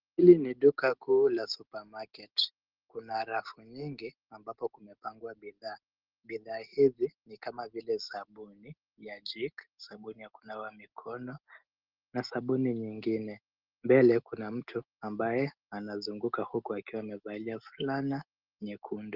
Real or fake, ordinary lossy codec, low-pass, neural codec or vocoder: real; Opus, 16 kbps; 5.4 kHz; none